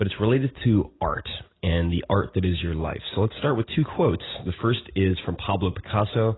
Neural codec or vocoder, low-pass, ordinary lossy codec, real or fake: none; 7.2 kHz; AAC, 16 kbps; real